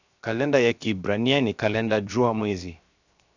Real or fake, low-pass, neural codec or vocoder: fake; 7.2 kHz; codec, 16 kHz, 0.7 kbps, FocalCodec